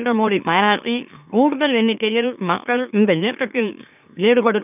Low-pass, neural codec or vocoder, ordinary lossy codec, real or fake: 3.6 kHz; autoencoder, 44.1 kHz, a latent of 192 numbers a frame, MeloTTS; none; fake